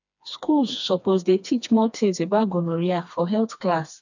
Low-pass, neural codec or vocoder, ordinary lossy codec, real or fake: 7.2 kHz; codec, 16 kHz, 2 kbps, FreqCodec, smaller model; none; fake